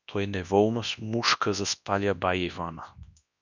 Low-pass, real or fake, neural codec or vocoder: 7.2 kHz; fake; codec, 24 kHz, 0.9 kbps, WavTokenizer, large speech release